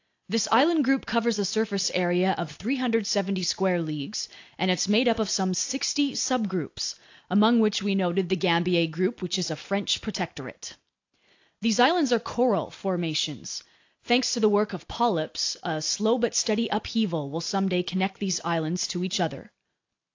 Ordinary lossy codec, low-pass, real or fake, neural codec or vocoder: AAC, 48 kbps; 7.2 kHz; real; none